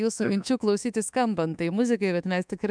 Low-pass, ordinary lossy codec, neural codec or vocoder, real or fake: 9.9 kHz; MP3, 96 kbps; codec, 24 kHz, 1.2 kbps, DualCodec; fake